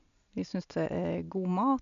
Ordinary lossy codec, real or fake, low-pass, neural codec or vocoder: none; real; 7.2 kHz; none